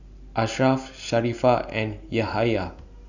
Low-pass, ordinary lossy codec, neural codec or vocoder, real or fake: 7.2 kHz; none; none; real